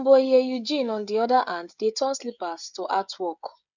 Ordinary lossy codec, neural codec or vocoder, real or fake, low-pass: none; codec, 16 kHz, 16 kbps, FreqCodec, smaller model; fake; 7.2 kHz